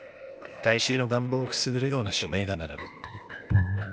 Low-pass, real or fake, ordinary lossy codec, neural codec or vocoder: none; fake; none; codec, 16 kHz, 0.8 kbps, ZipCodec